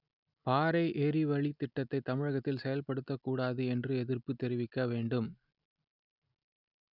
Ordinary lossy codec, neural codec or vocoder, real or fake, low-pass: none; none; real; 5.4 kHz